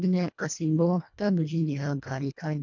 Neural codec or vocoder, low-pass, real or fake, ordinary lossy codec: codec, 24 kHz, 1.5 kbps, HILCodec; 7.2 kHz; fake; none